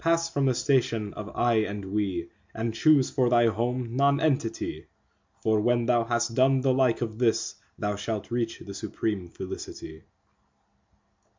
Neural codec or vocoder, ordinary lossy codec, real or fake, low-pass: none; MP3, 64 kbps; real; 7.2 kHz